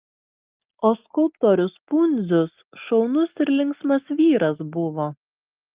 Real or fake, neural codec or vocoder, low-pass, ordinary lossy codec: real; none; 3.6 kHz; Opus, 32 kbps